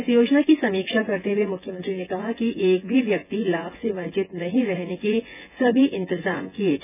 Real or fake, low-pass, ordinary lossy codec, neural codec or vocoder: fake; 3.6 kHz; none; vocoder, 24 kHz, 100 mel bands, Vocos